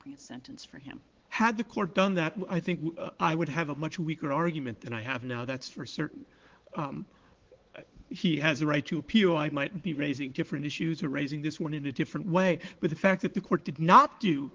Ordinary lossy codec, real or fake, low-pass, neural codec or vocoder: Opus, 16 kbps; fake; 7.2 kHz; codec, 16 kHz, 4 kbps, X-Codec, WavLM features, trained on Multilingual LibriSpeech